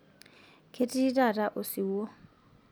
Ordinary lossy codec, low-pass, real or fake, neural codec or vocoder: none; none; real; none